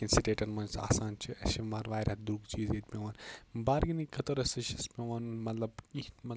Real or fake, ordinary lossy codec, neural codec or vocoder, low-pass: real; none; none; none